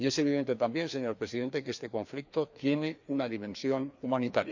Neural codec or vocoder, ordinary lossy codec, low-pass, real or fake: codec, 16 kHz, 2 kbps, FreqCodec, larger model; none; 7.2 kHz; fake